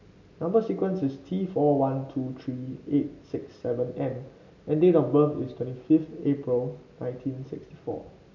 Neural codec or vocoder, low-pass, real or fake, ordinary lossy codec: none; 7.2 kHz; real; MP3, 64 kbps